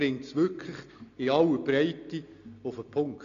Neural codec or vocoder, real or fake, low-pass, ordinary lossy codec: none; real; 7.2 kHz; MP3, 96 kbps